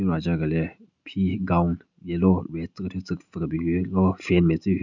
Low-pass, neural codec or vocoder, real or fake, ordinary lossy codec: 7.2 kHz; none; real; none